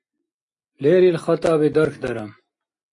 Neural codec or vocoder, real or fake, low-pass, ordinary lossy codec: none; real; 10.8 kHz; AAC, 48 kbps